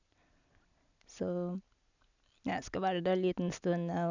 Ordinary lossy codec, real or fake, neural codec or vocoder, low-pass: none; real; none; 7.2 kHz